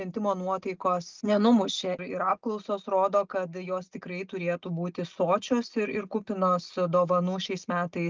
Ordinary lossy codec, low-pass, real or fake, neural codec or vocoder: Opus, 32 kbps; 7.2 kHz; real; none